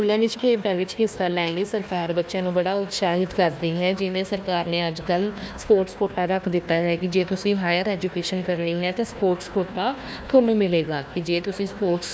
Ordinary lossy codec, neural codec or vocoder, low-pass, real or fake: none; codec, 16 kHz, 1 kbps, FunCodec, trained on Chinese and English, 50 frames a second; none; fake